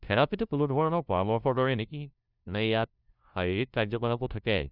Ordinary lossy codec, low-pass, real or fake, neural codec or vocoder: none; 5.4 kHz; fake; codec, 16 kHz, 0.5 kbps, FunCodec, trained on LibriTTS, 25 frames a second